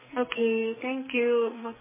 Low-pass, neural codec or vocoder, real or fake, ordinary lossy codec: 3.6 kHz; codec, 44.1 kHz, 2.6 kbps, SNAC; fake; MP3, 16 kbps